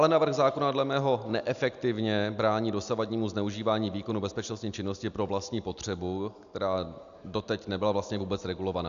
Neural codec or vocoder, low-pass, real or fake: none; 7.2 kHz; real